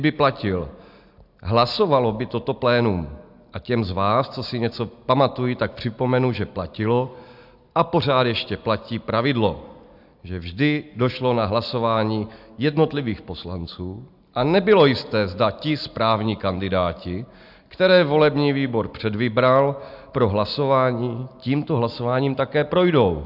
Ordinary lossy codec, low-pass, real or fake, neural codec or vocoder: AAC, 48 kbps; 5.4 kHz; real; none